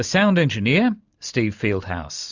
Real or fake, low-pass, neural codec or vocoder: real; 7.2 kHz; none